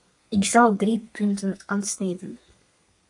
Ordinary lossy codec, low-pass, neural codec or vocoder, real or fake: AAC, 64 kbps; 10.8 kHz; codec, 32 kHz, 1.9 kbps, SNAC; fake